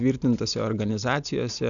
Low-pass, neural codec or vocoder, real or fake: 7.2 kHz; none; real